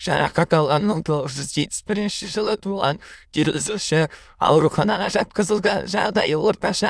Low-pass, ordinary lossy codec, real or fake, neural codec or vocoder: none; none; fake; autoencoder, 22.05 kHz, a latent of 192 numbers a frame, VITS, trained on many speakers